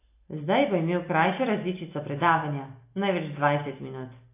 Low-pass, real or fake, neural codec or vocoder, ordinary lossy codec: 3.6 kHz; real; none; AAC, 24 kbps